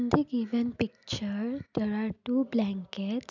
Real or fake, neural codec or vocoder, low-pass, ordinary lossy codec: real; none; 7.2 kHz; none